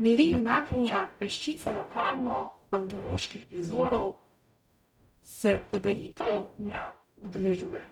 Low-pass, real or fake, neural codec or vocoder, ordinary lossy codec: 19.8 kHz; fake; codec, 44.1 kHz, 0.9 kbps, DAC; none